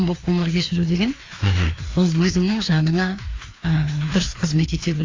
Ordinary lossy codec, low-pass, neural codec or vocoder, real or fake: AAC, 32 kbps; 7.2 kHz; codec, 16 kHz, 2 kbps, FreqCodec, larger model; fake